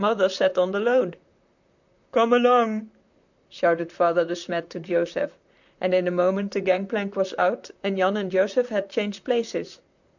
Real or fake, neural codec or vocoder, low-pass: fake; vocoder, 44.1 kHz, 128 mel bands, Pupu-Vocoder; 7.2 kHz